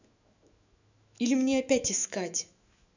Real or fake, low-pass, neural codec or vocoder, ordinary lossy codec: fake; 7.2 kHz; autoencoder, 48 kHz, 128 numbers a frame, DAC-VAE, trained on Japanese speech; none